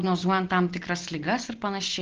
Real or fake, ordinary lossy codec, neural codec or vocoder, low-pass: real; Opus, 16 kbps; none; 7.2 kHz